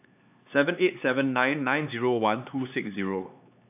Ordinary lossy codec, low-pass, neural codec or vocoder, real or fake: AAC, 32 kbps; 3.6 kHz; codec, 16 kHz, 4 kbps, X-Codec, HuBERT features, trained on LibriSpeech; fake